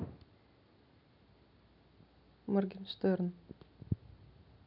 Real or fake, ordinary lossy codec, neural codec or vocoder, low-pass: real; none; none; 5.4 kHz